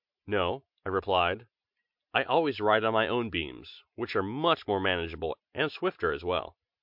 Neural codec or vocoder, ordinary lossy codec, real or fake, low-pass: none; MP3, 48 kbps; real; 5.4 kHz